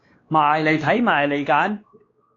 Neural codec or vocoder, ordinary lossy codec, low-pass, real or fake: codec, 16 kHz, 2 kbps, X-Codec, WavLM features, trained on Multilingual LibriSpeech; AAC, 32 kbps; 7.2 kHz; fake